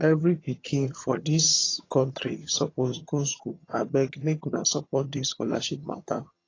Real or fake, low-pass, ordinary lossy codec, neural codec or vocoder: fake; 7.2 kHz; AAC, 32 kbps; vocoder, 22.05 kHz, 80 mel bands, HiFi-GAN